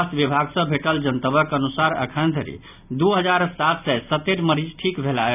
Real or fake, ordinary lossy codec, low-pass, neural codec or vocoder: real; none; 3.6 kHz; none